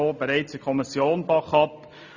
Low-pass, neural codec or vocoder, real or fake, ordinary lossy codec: 7.2 kHz; none; real; none